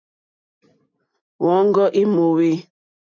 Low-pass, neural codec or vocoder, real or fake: 7.2 kHz; none; real